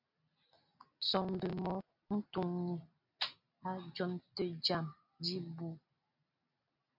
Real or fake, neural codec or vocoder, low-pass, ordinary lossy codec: real; none; 5.4 kHz; MP3, 48 kbps